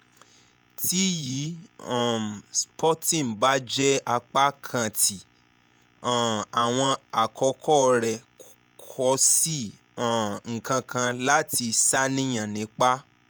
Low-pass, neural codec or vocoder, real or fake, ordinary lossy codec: none; vocoder, 48 kHz, 128 mel bands, Vocos; fake; none